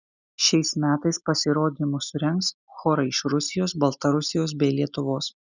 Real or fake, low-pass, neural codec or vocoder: real; 7.2 kHz; none